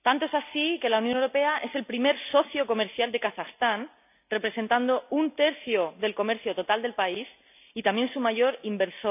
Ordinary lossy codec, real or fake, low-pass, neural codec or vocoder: none; real; 3.6 kHz; none